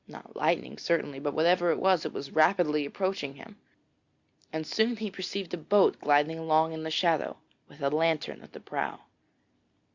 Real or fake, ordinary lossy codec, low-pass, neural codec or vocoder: real; Opus, 64 kbps; 7.2 kHz; none